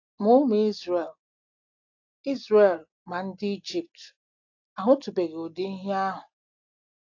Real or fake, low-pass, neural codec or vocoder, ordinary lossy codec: real; 7.2 kHz; none; AAC, 48 kbps